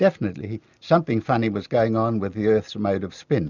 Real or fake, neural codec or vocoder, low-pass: real; none; 7.2 kHz